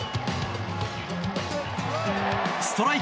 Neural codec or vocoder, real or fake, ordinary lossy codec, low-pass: none; real; none; none